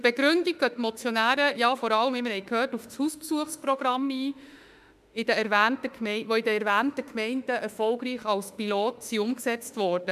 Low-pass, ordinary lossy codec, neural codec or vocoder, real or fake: 14.4 kHz; none; autoencoder, 48 kHz, 32 numbers a frame, DAC-VAE, trained on Japanese speech; fake